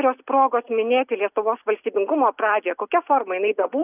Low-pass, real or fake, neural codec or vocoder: 3.6 kHz; real; none